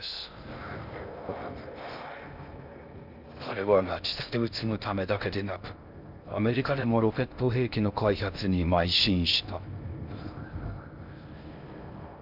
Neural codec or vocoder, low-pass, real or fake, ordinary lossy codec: codec, 16 kHz in and 24 kHz out, 0.6 kbps, FocalCodec, streaming, 2048 codes; 5.4 kHz; fake; none